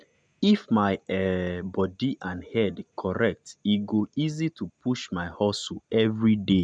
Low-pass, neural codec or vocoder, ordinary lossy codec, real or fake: 9.9 kHz; none; none; real